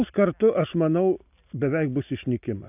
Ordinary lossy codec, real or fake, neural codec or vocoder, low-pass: AAC, 32 kbps; real; none; 3.6 kHz